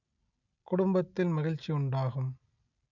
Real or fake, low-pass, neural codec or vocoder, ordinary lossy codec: real; 7.2 kHz; none; none